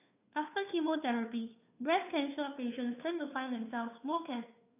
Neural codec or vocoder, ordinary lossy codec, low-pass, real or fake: codec, 16 kHz, 2 kbps, FunCodec, trained on Chinese and English, 25 frames a second; none; 3.6 kHz; fake